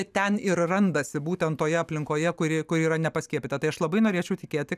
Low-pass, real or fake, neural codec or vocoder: 14.4 kHz; real; none